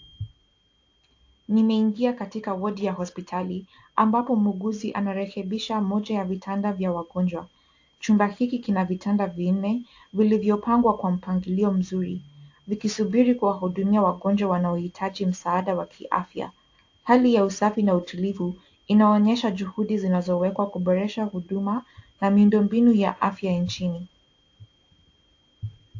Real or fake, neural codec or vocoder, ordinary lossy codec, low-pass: real; none; AAC, 48 kbps; 7.2 kHz